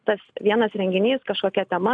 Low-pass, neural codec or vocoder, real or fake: 9.9 kHz; none; real